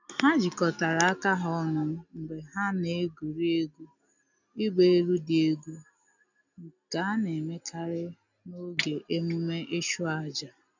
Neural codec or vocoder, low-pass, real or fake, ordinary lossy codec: none; 7.2 kHz; real; none